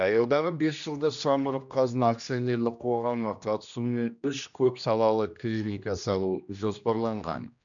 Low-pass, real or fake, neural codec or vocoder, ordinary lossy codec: 7.2 kHz; fake; codec, 16 kHz, 1 kbps, X-Codec, HuBERT features, trained on general audio; none